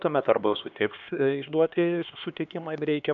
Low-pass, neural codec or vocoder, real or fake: 7.2 kHz; codec, 16 kHz, 2 kbps, X-Codec, HuBERT features, trained on LibriSpeech; fake